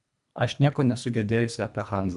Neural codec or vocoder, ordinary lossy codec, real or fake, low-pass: codec, 24 kHz, 1.5 kbps, HILCodec; AAC, 96 kbps; fake; 10.8 kHz